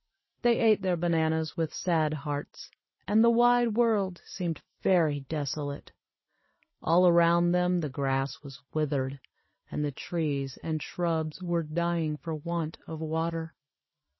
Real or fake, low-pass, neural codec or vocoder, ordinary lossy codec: real; 7.2 kHz; none; MP3, 24 kbps